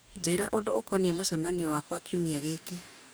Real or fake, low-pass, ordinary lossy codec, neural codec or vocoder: fake; none; none; codec, 44.1 kHz, 2.6 kbps, DAC